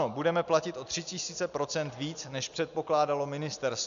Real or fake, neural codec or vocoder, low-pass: real; none; 7.2 kHz